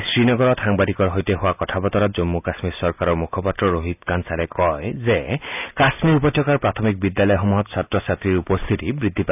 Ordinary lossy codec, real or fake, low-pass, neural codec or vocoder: none; real; 3.6 kHz; none